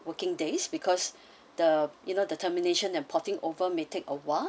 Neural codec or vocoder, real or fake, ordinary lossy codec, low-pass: none; real; none; none